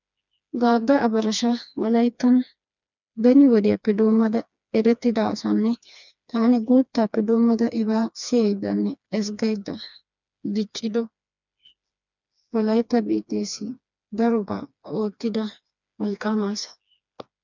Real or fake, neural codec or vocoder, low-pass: fake; codec, 16 kHz, 2 kbps, FreqCodec, smaller model; 7.2 kHz